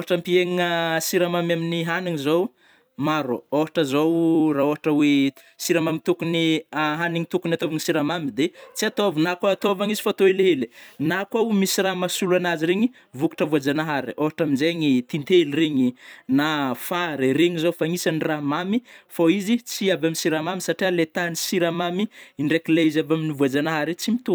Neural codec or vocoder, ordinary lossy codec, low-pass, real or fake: vocoder, 44.1 kHz, 128 mel bands every 256 samples, BigVGAN v2; none; none; fake